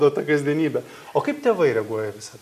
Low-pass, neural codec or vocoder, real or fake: 14.4 kHz; none; real